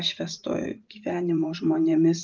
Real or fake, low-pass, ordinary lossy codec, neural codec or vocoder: fake; 7.2 kHz; Opus, 32 kbps; vocoder, 44.1 kHz, 128 mel bands every 512 samples, BigVGAN v2